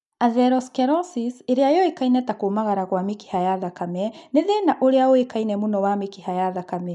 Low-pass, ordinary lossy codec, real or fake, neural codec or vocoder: 10.8 kHz; none; real; none